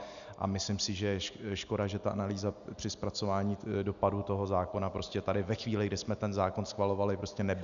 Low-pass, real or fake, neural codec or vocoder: 7.2 kHz; real; none